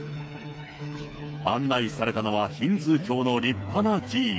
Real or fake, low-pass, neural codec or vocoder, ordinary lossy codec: fake; none; codec, 16 kHz, 4 kbps, FreqCodec, smaller model; none